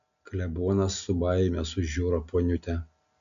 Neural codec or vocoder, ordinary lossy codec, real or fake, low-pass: none; AAC, 96 kbps; real; 7.2 kHz